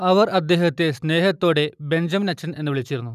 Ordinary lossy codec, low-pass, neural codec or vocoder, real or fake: none; 14.4 kHz; none; real